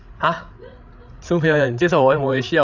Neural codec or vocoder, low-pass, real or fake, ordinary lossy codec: codec, 16 kHz, 8 kbps, FreqCodec, larger model; 7.2 kHz; fake; none